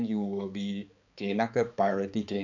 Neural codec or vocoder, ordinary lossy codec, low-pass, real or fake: codec, 16 kHz, 4 kbps, X-Codec, HuBERT features, trained on balanced general audio; none; 7.2 kHz; fake